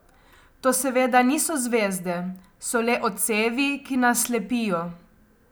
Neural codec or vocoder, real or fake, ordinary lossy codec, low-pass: none; real; none; none